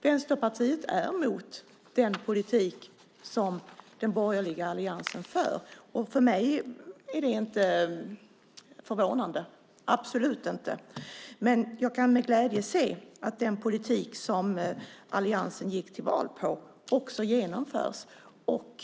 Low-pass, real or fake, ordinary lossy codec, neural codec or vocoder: none; real; none; none